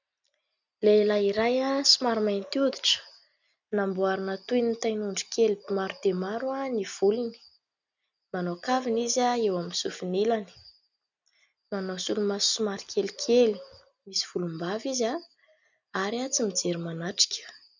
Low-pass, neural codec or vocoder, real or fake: 7.2 kHz; none; real